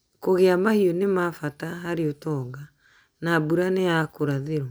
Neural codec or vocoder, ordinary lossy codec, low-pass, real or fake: none; none; none; real